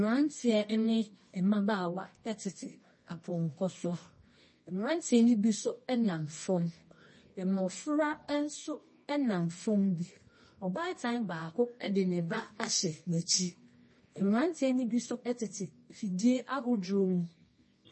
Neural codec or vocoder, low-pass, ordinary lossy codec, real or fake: codec, 24 kHz, 0.9 kbps, WavTokenizer, medium music audio release; 10.8 kHz; MP3, 32 kbps; fake